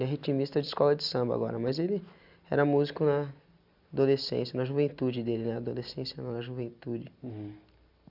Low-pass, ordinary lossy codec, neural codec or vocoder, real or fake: 5.4 kHz; none; none; real